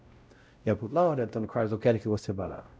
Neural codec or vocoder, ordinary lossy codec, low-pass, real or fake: codec, 16 kHz, 0.5 kbps, X-Codec, WavLM features, trained on Multilingual LibriSpeech; none; none; fake